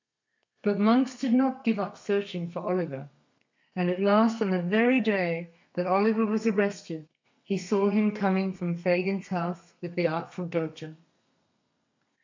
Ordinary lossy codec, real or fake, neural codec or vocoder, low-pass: MP3, 64 kbps; fake; codec, 32 kHz, 1.9 kbps, SNAC; 7.2 kHz